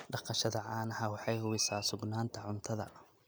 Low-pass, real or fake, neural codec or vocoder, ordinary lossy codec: none; real; none; none